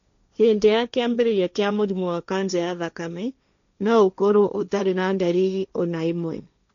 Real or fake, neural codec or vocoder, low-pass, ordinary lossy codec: fake; codec, 16 kHz, 1.1 kbps, Voila-Tokenizer; 7.2 kHz; none